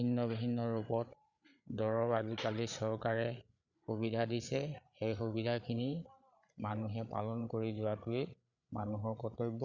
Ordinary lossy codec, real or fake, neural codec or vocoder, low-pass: none; fake; codec, 16 kHz, 8 kbps, FreqCodec, larger model; 7.2 kHz